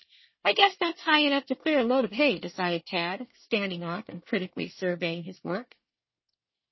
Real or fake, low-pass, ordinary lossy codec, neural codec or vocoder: fake; 7.2 kHz; MP3, 24 kbps; codec, 24 kHz, 1 kbps, SNAC